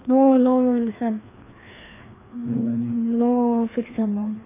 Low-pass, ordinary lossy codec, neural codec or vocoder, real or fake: 3.6 kHz; AAC, 16 kbps; codec, 16 kHz, 2 kbps, FreqCodec, larger model; fake